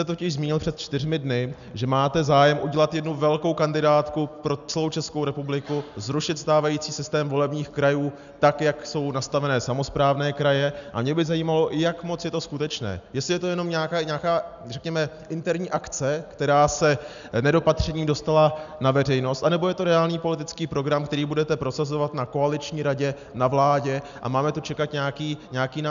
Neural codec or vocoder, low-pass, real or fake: none; 7.2 kHz; real